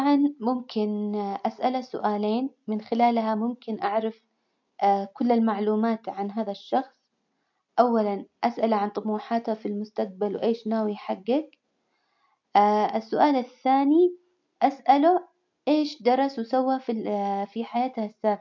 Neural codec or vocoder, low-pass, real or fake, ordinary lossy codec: none; 7.2 kHz; real; MP3, 48 kbps